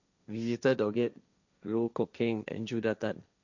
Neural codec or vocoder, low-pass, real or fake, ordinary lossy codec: codec, 16 kHz, 1.1 kbps, Voila-Tokenizer; 7.2 kHz; fake; none